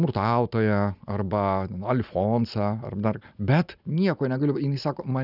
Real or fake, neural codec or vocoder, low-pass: real; none; 5.4 kHz